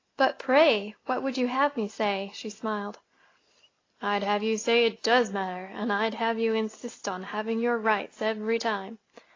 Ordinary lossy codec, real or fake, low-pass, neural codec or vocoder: AAC, 32 kbps; real; 7.2 kHz; none